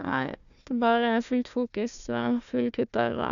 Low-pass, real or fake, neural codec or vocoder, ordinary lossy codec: 7.2 kHz; fake; codec, 16 kHz, 1 kbps, FunCodec, trained on Chinese and English, 50 frames a second; none